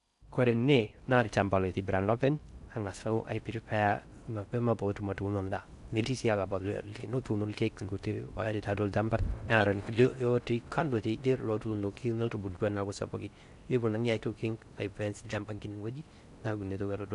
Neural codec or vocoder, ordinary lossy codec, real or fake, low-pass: codec, 16 kHz in and 24 kHz out, 0.6 kbps, FocalCodec, streaming, 4096 codes; none; fake; 10.8 kHz